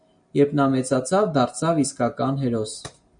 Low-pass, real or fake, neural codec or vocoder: 9.9 kHz; real; none